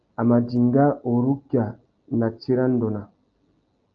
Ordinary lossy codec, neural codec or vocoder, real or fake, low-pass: Opus, 24 kbps; none; real; 7.2 kHz